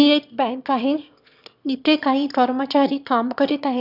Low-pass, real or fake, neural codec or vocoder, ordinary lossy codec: 5.4 kHz; fake; autoencoder, 22.05 kHz, a latent of 192 numbers a frame, VITS, trained on one speaker; none